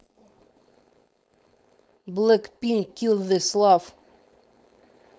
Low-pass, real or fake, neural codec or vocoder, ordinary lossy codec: none; fake; codec, 16 kHz, 4.8 kbps, FACodec; none